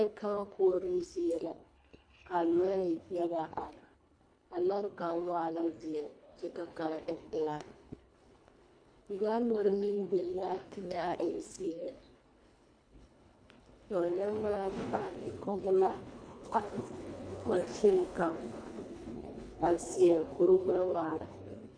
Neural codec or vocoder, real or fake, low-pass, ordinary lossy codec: codec, 24 kHz, 1.5 kbps, HILCodec; fake; 9.9 kHz; AAC, 64 kbps